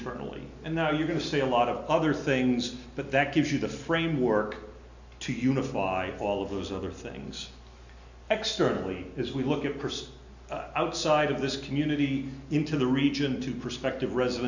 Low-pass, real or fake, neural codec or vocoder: 7.2 kHz; real; none